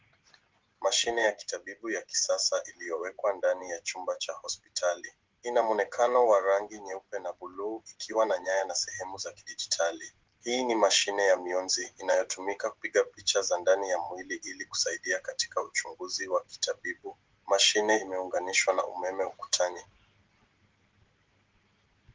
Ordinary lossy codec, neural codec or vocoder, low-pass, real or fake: Opus, 16 kbps; none; 7.2 kHz; real